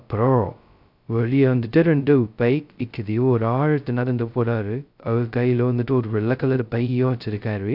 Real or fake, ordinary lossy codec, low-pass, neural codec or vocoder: fake; none; 5.4 kHz; codec, 16 kHz, 0.2 kbps, FocalCodec